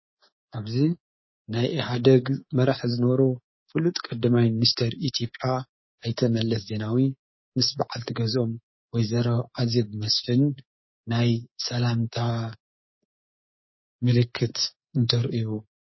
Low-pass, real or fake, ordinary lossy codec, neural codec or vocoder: 7.2 kHz; fake; MP3, 24 kbps; codec, 24 kHz, 3.1 kbps, DualCodec